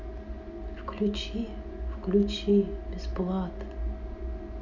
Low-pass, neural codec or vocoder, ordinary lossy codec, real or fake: 7.2 kHz; none; none; real